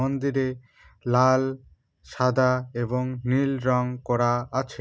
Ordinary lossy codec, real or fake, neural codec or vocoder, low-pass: none; real; none; none